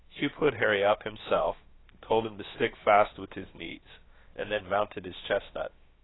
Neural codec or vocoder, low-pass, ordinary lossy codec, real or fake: codec, 16 kHz, about 1 kbps, DyCAST, with the encoder's durations; 7.2 kHz; AAC, 16 kbps; fake